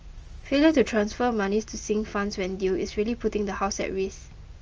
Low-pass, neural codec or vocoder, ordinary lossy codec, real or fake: 7.2 kHz; none; Opus, 24 kbps; real